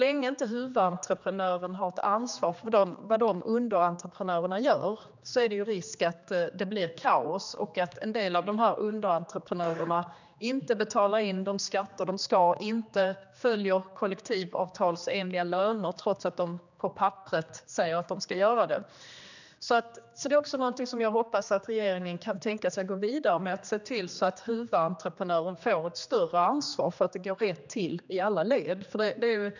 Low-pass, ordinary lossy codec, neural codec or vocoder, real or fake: 7.2 kHz; none; codec, 16 kHz, 2 kbps, X-Codec, HuBERT features, trained on general audio; fake